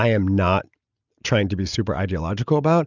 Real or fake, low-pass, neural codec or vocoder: real; 7.2 kHz; none